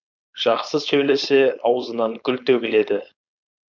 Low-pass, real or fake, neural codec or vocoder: 7.2 kHz; fake; codec, 16 kHz, 4.8 kbps, FACodec